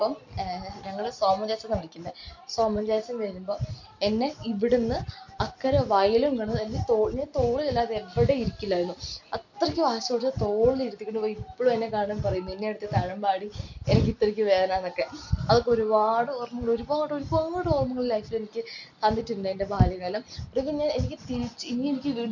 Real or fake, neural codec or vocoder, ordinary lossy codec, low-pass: real; none; none; 7.2 kHz